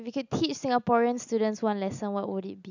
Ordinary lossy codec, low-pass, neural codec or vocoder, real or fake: none; 7.2 kHz; none; real